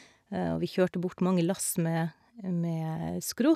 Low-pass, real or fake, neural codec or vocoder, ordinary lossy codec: 14.4 kHz; real; none; none